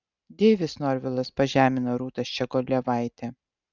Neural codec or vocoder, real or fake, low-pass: none; real; 7.2 kHz